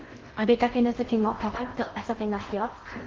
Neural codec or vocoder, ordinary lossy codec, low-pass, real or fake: codec, 16 kHz in and 24 kHz out, 0.6 kbps, FocalCodec, streaming, 2048 codes; Opus, 16 kbps; 7.2 kHz; fake